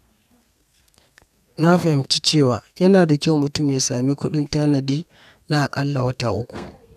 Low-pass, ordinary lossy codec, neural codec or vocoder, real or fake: 14.4 kHz; none; codec, 32 kHz, 1.9 kbps, SNAC; fake